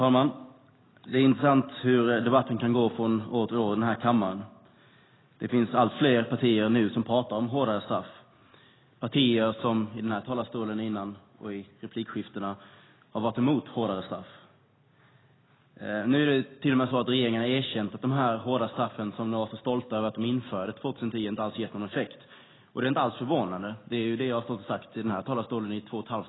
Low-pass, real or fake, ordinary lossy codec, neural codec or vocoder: 7.2 kHz; real; AAC, 16 kbps; none